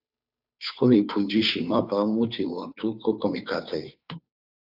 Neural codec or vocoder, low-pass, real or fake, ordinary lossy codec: codec, 16 kHz, 2 kbps, FunCodec, trained on Chinese and English, 25 frames a second; 5.4 kHz; fake; AAC, 48 kbps